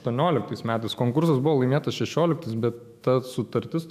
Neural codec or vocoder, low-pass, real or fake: autoencoder, 48 kHz, 128 numbers a frame, DAC-VAE, trained on Japanese speech; 14.4 kHz; fake